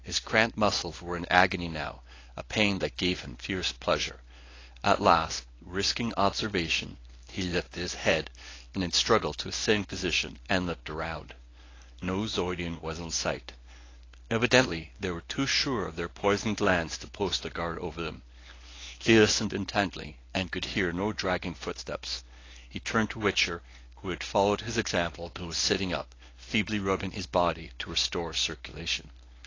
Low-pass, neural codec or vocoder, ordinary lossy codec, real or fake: 7.2 kHz; codec, 24 kHz, 0.9 kbps, WavTokenizer, medium speech release version 1; AAC, 32 kbps; fake